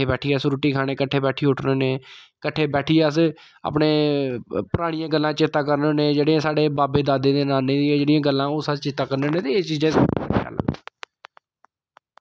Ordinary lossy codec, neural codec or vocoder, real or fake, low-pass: none; none; real; none